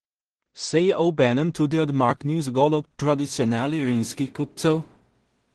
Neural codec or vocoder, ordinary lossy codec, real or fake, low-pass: codec, 16 kHz in and 24 kHz out, 0.4 kbps, LongCat-Audio-Codec, two codebook decoder; Opus, 16 kbps; fake; 10.8 kHz